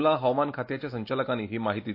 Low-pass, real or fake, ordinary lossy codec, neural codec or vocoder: 5.4 kHz; real; MP3, 32 kbps; none